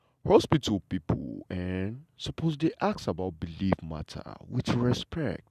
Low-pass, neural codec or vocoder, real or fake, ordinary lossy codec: 14.4 kHz; none; real; none